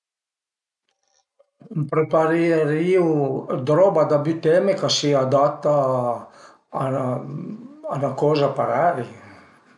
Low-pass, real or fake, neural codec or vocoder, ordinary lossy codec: 10.8 kHz; real; none; none